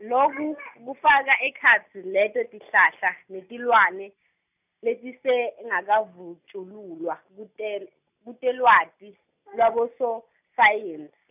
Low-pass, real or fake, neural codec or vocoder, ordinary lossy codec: 3.6 kHz; real; none; none